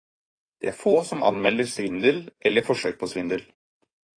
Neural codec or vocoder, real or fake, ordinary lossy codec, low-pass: codec, 16 kHz in and 24 kHz out, 2.2 kbps, FireRedTTS-2 codec; fake; AAC, 32 kbps; 9.9 kHz